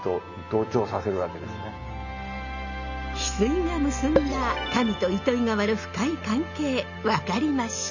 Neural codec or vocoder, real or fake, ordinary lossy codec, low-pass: none; real; none; 7.2 kHz